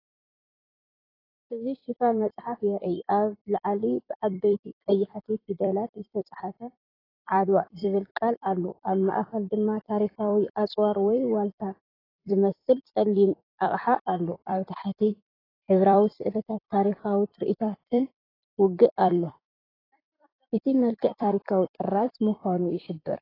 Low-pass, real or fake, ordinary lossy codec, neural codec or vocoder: 5.4 kHz; fake; AAC, 24 kbps; vocoder, 44.1 kHz, 128 mel bands every 256 samples, BigVGAN v2